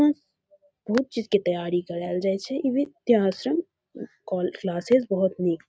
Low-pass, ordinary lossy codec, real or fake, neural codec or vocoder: none; none; real; none